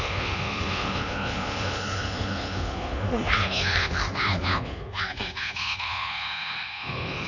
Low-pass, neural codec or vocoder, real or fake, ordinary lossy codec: 7.2 kHz; codec, 24 kHz, 1.2 kbps, DualCodec; fake; none